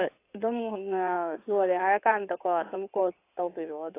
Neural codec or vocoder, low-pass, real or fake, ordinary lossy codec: codec, 16 kHz in and 24 kHz out, 2.2 kbps, FireRedTTS-2 codec; 3.6 kHz; fake; AAC, 24 kbps